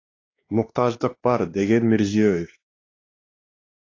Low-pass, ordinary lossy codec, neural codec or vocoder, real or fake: 7.2 kHz; AAC, 32 kbps; codec, 16 kHz, 2 kbps, X-Codec, WavLM features, trained on Multilingual LibriSpeech; fake